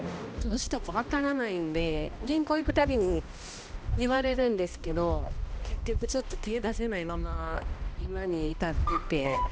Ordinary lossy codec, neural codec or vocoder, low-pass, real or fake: none; codec, 16 kHz, 1 kbps, X-Codec, HuBERT features, trained on balanced general audio; none; fake